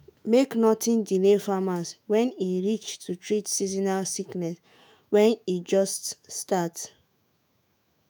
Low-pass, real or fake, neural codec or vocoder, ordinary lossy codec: none; fake; autoencoder, 48 kHz, 128 numbers a frame, DAC-VAE, trained on Japanese speech; none